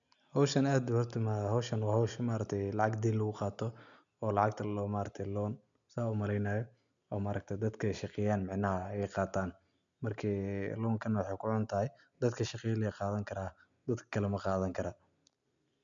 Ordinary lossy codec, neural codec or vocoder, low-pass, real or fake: none; none; 7.2 kHz; real